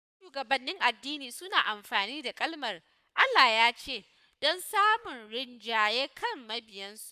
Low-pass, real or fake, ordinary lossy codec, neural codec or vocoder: 14.4 kHz; fake; none; codec, 44.1 kHz, 7.8 kbps, Pupu-Codec